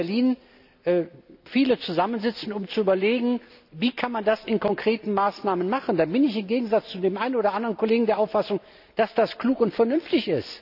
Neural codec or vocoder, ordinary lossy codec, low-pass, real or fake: none; none; 5.4 kHz; real